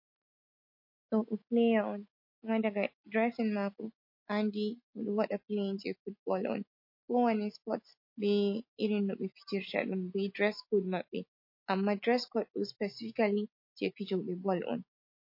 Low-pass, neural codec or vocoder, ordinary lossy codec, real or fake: 5.4 kHz; none; MP3, 32 kbps; real